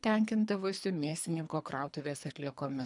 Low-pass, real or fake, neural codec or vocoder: 10.8 kHz; fake; codec, 24 kHz, 3 kbps, HILCodec